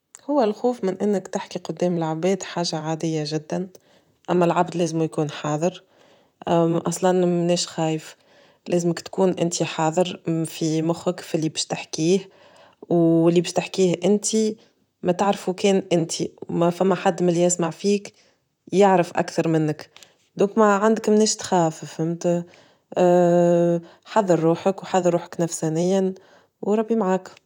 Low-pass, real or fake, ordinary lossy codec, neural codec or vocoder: 19.8 kHz; fake; none; vocoder, 44.1 kHz, 128 mel bands, Pupu-Vocoder